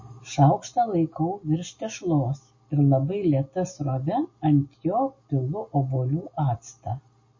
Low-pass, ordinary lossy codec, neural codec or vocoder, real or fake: 7.2 kHz; MP3, 32 kbps; none; real